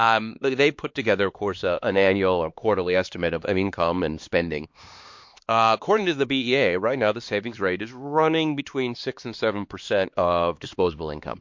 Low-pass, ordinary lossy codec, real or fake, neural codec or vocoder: 7.2 kHz; MP3, 48 kbps; fake; codec, 16 kHz, 2 kbps, X-Codec, HuBERT features, trained on LibriSpeech